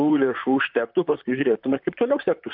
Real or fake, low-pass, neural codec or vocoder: fake; 5.4 kHz; vocoder, 44.1 kHz, 128 mel bands, Pupu-Vocoder